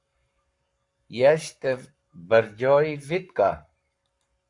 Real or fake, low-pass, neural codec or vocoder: fake; 10.8 kHz; codec, 44.1 kHz, 7.8 kbps, Pupu-Codec